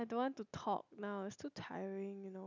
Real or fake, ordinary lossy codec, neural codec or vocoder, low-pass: real; none; none; 7.2 kHz